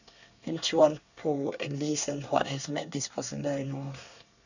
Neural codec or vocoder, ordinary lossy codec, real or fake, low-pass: codec, 24 kHz, 1 kbps, SNAC; none; fake; 7.2 kHz